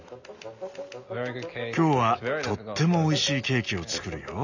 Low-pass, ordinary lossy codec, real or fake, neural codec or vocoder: 7.2 kHz; none; real; none